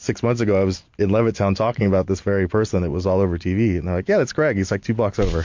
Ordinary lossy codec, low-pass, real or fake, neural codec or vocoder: MP3, 48 kbps; 7.2 kHz; real; none